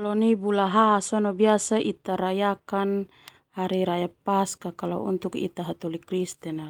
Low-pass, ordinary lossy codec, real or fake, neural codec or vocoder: 19.8 kHz; Opus, 32 kbps; real; none